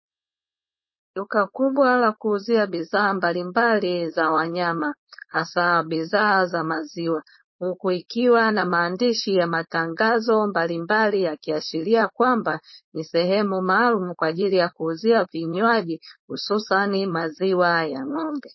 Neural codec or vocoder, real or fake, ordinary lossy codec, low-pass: codec, 16 kHz, 4.8 kbps, FACodec; fake; MP3, 24 kbps; 7.2 kHz